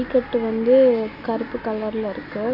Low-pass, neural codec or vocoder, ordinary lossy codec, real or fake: 5.4 kHz; none; none; real